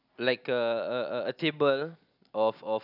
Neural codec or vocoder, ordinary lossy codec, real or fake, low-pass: none; none; real; 5.4 kHz